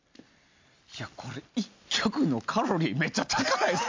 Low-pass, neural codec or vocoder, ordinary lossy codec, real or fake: 7.2 kHz; none; MP3, 64 kbps; real